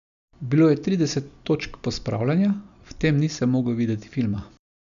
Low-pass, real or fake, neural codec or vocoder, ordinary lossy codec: 7.2 kHz; real; none; none